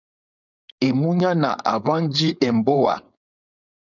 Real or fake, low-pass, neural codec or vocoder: fake; 7.2 kHz; codec, 16 kHz, 4.8 kbps, FACodec